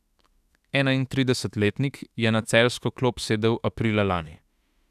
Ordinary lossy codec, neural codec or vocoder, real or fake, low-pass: none; autoencoder, 48 kHz, 32 numbers a frame, DAC-VAE, trained on Japanese speech; fake; 14.4 kHz